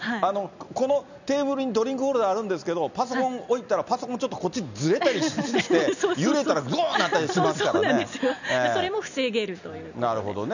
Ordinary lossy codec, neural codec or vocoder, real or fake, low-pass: none; none; real; 7.2 kHz